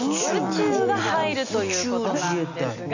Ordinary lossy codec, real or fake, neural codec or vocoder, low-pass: none; real; none; 7.2 kHz